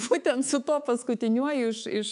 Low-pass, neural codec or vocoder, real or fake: 10.8 kHz; codec, 24 kHz, 3.1 kbps, DualCodec; fake